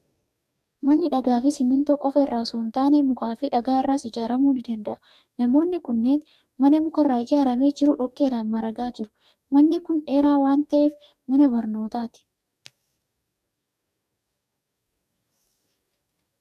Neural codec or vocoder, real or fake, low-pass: codec, 44.1 kHz, 2.6 kbps, DAC; fake; 14.4 kHz